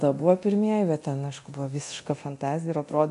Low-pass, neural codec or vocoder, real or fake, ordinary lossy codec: 10.8 kHz; codec, 24 kHz, 0.9 kbps, DualCodec; fake; MP3, 96 kbps